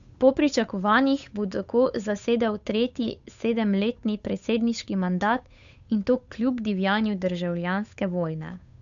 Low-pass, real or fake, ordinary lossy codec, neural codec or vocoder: 7.2 kHz; fake; none; codec, 16 kHz, 8 kbps, FunCodec, trained on Chinese and English, 25 frames a second